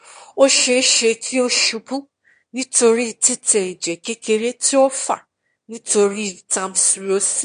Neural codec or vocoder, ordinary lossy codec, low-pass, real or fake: autoencoder, 22.05 kHz, a latent of 192 numbers a frame, VITS, trained on one speaker; MP3, 48 kbps; 9.9 kHz; fake